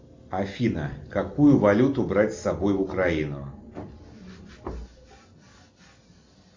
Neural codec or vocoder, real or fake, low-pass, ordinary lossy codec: none; real; 7.2 kHz; MP3, 64 kbps